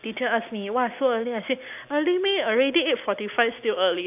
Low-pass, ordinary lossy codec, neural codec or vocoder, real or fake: 3.6 kHz; none; none; real